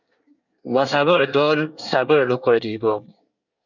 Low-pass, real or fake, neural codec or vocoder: 7.2 kHz; fake; codec, 24 kHz, 1 kbps, SNAC